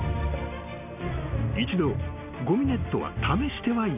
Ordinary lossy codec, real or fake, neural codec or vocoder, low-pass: none; real; none; 3.6 kHz